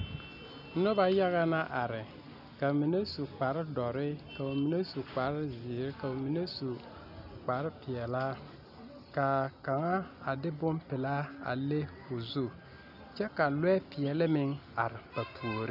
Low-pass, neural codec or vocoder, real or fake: 5.4 kHz; none; real